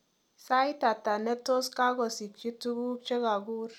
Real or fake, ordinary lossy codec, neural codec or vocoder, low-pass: real; none; none; 19.8 kHz